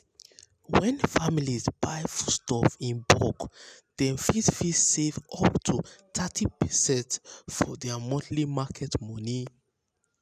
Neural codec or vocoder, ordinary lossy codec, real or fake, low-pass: none; none; real; 14.4 kHz